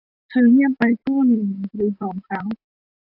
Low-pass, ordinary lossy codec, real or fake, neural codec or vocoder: 5.4 kHz; AAC, 48 kbps; fake; vocoder, 44.1 kHz, 128 mel bands, Pupu-Vocoder